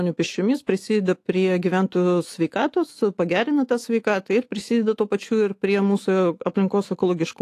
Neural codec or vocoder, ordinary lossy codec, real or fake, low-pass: autoencoder, 48 kHz, 128 numbers a frame, DAC-VAE, trained on Japanese speech; AAC, 48 kbps; fake; 14.4 kHz